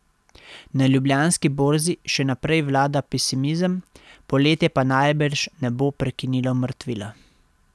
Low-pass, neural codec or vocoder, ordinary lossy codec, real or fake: none; none; none; real